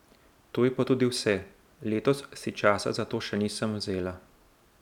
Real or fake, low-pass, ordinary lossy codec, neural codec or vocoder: real; 19.8 kHz; none; none